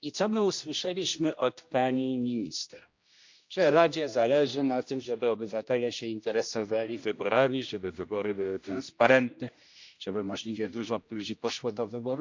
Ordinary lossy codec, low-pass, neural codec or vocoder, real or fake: MP3, 64 kbps; 7.2 kHz; codec, 16 kHz, 1 kbps, X-Codec, HuBERT features, trained on general audio; fake